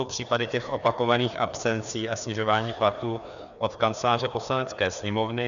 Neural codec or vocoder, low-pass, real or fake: codec, 16 kHz, 2 kbps, FreqCodec, larger model; 7.2 kHz; fake